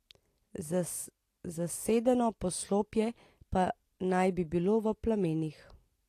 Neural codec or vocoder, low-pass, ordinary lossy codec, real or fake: none; 14.4 kHz; AAC, 48 kbps; real